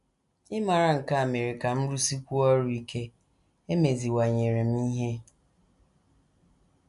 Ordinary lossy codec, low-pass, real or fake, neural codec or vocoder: none; 10.8 kHz; real; none